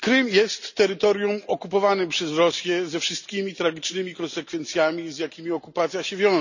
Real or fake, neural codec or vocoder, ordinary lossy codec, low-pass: real; none; none; 7.2 kHz